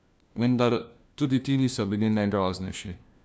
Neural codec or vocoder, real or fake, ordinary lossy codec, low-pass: codec, 16 kHz, 1 kbps, FunCodec, trained on LibriTTS, 50 frames a second; fake; none; none